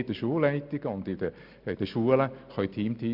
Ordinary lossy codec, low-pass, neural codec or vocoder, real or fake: none; 5.4 kHz; none; real